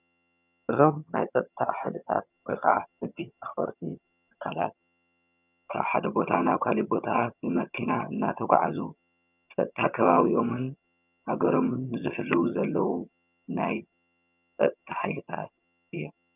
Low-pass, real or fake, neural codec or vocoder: 3.6 kHz; fake; vocoder, 22.05 kHz, 80 mel bands, HiFi-GAN